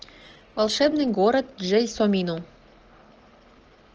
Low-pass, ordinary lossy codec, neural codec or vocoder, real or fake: 7.2 kHz; Opus, 16 kbps; none; real